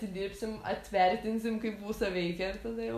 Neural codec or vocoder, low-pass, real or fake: none; 14.4 kHz; real